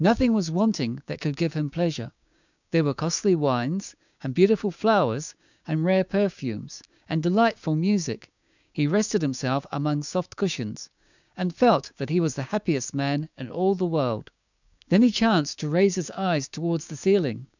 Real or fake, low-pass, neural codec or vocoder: fake; 7.2 kHz; codec, 16 kHz, 6 kbps, DAC